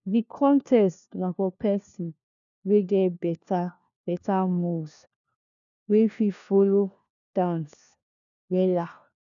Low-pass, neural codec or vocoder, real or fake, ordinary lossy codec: 7.2 kHz; codec, 16 kHz, 1 kbps, FunCodec, trained on LibriTTS, 50 frames a second; fake; none